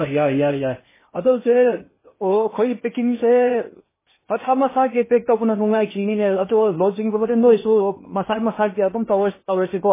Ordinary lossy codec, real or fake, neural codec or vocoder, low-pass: MP3, 16 kbps; fake; codec, 16 kHz in and 24 kHz out, 0.8 kbps, FocalCodec, streaming, 65536 codes; 3.6 kHz